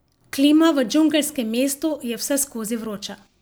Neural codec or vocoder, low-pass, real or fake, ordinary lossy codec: none; none; real; none